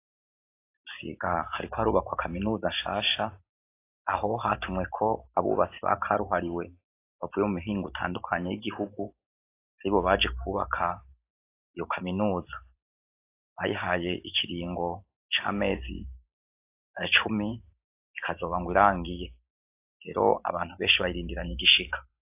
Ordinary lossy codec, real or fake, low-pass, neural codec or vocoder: AAC, 24 kbps; fake; 3.6 kHz; vocoder, 44.1 kHz, 128 mel bands every 512 samples, BigVGAN v2